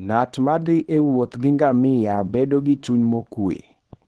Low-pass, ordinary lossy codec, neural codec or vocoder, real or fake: 10.8 kHz; Opus, 16 kbps; codec, 24 kHz, 0.9 kbps, WavTokenizer, small release; fake